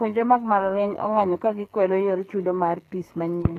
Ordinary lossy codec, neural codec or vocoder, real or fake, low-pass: AAC, 48 kbps; codec, 44.1 kHz, 2.6 kbps, SNAC; fake; 14.4 kHz